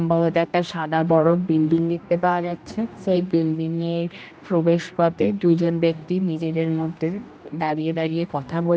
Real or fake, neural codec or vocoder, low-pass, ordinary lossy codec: fake; codec, 16 kHz, 1 kbps, X-Codec, HuBERT features, trained on general audio; none; none